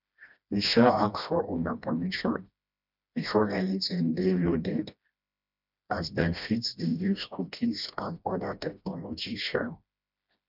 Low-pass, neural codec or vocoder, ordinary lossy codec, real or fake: 5.4 kHz; codec, 16 kHz, 1 kbps, FreqCodec, smaller model; none; fake